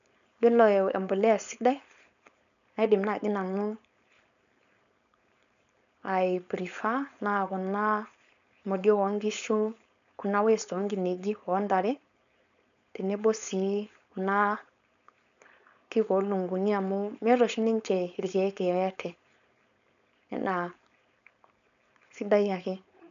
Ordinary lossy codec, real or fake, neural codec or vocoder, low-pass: none; fake; codec, 16 kHz, 4.8 kbps, FACodec; 7.2 kHz